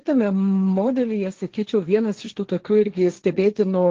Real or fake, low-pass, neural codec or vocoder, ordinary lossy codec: fake; 7.2 kHz; codec, 16 kHz, 1.1 kbps, Voila-Tokenizer; Opus, 16 kbps